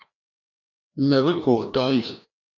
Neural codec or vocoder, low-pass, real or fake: codec, 16 kHz, 1 kbps, FreqCodec, larger model; 7.2 kHz; fake